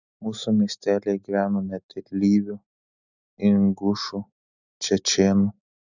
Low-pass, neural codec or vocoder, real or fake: 7.2 kHz; none; real